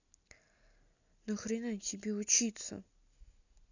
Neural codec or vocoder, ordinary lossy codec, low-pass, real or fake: none; none; 7.2 kHz; real